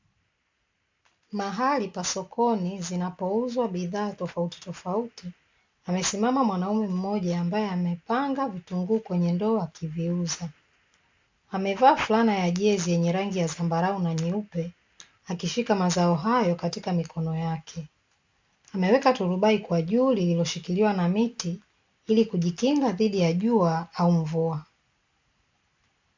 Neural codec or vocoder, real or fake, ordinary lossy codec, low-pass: none; real; MP3, 64 kbps; 7.2 kHz